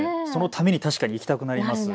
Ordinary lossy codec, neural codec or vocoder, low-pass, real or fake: none; none; none; real